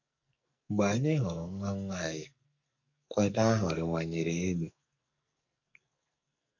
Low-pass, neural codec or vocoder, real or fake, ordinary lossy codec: 7.2 kHz; codec, 44.1 kHz, 2.6 kbps, SNAC; fake; none